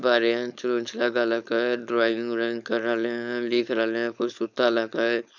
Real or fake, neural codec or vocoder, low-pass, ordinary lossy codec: fake; codec, 16 kHz, 4.8 kbps, FACodec; 7.2 kHz; none